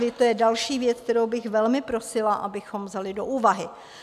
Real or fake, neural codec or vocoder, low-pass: real; none; 14.4 kHz